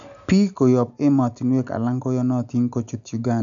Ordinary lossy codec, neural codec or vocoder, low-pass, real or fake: none; none; 7.2 kHz; real